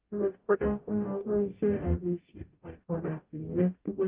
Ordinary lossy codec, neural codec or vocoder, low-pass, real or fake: Opus, 32 kbps; codec, 44.1 kHz, 0.9 kbps, DAC; 3.6 kHz; fake